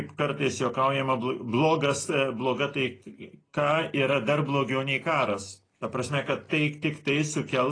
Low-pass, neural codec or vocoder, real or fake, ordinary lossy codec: 9.9 kHz; none; real; AAC, 32 kbps